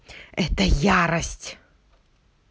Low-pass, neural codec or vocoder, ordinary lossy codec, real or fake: none; none; none; real